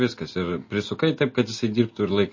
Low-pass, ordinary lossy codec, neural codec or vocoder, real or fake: 7.2 kHz; MP3, 32 kbps; none; real